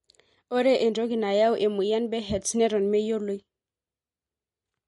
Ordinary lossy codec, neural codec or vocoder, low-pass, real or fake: MP3, 48 kbps; none; 19.8 kHz; real